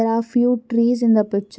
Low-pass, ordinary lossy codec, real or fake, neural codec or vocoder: none; none; real; none